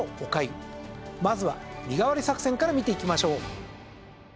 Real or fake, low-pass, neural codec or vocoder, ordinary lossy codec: real; none; none; none